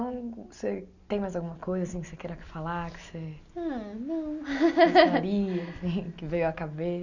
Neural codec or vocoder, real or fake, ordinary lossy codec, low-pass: none; real; none; 7.2 kHz